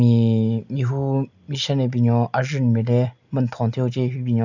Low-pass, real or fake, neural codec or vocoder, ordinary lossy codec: 7.2 kHz; real; none; none